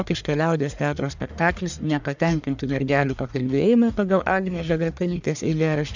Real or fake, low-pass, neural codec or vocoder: fake; 7.2 kHz; codec, 44.1 kHz, 1.7 kbps, Pupu-Codec